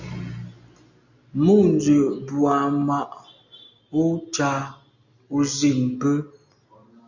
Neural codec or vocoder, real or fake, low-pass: none; real; 7.2 kHz